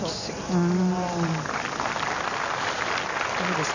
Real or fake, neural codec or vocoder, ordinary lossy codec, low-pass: real; none; none; 7.2 kHz